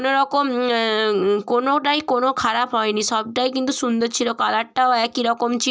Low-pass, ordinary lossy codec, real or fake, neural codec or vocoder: none; none; real; none